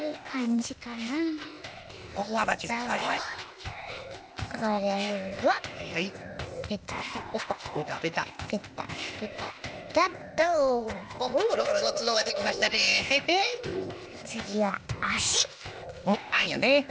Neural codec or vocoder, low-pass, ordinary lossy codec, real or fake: codec, 16 kHz, 0.8 kbps, ZipCodec; none; none; fake